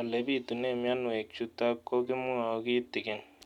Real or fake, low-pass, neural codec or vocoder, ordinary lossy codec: real; 19.8 kHz; none; none